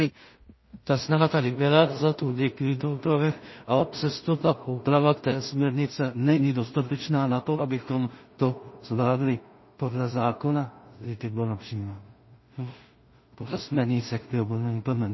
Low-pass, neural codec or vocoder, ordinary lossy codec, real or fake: 7.2 kHz; codec, 16 kHz in and 24 kHz out, 0.4 kbps, LongCat-Audio-Codec, two codebook decoder; MP3, 24 kbps; fake